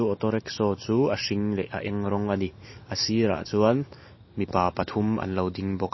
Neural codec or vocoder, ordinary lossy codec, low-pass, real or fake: none; MP3, 24 kbps; 7.2 kHz; real